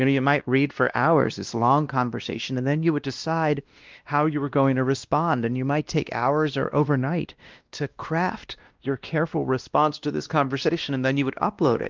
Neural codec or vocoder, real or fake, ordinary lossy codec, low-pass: codec, 16 kHz, 1 kbps, X-Codec, WavLM features, trained on Multilingual LibriSpeech; fake; Opus, 24 kbps; 7.2 kHz